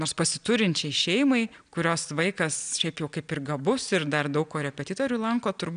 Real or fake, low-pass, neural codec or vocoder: real; 9.9 kHz; none